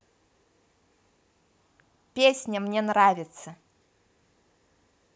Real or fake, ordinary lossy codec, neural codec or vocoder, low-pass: real; none; none; none